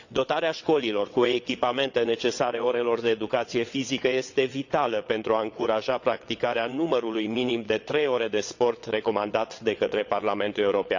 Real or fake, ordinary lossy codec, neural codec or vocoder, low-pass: fake; none; vocoder, 22.05 kHz, 80 mel bands, WaveNeXt; 7.2 kHz